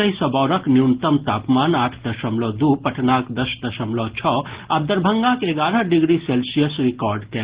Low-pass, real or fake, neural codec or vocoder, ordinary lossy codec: 3.6 kHz; real; none; Opus, 16 kbps